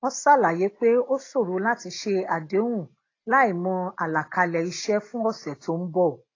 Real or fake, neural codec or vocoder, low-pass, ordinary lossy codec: real; none; 7.2 kHz; AAC, 32 kbps